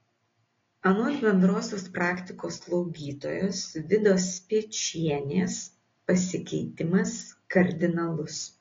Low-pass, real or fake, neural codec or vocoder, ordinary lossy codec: 7.2 kHz; real; none; AAC, 32 kbps